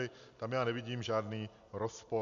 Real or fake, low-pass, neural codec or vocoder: real; 7.2 kHz; none